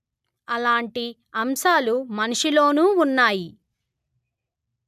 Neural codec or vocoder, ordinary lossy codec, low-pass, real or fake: none; none; 14.4 kHz; real